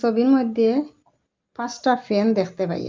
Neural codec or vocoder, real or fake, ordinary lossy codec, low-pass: none; real; Opus, 24 kbps; 7.2 kHz